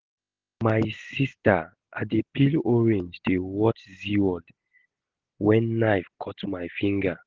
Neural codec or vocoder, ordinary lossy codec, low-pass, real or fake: none; Opus, 16 kbps; 7.2 kHz; real